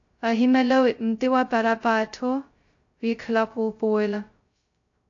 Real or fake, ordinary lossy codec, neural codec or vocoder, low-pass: fake; AAC, 48 kbps; codec, 16 kHz, 0.2 kbps, FocalCodec; 7.2 kHz